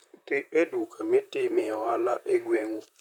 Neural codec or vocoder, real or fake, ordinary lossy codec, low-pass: vocoder, 44.1 kHz, 128 mel bands, Pupu-Vocoder; fake; none; 19.8 kHz